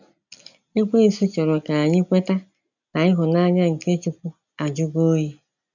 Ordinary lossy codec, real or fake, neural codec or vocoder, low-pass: none; real; none; 7.2 kHz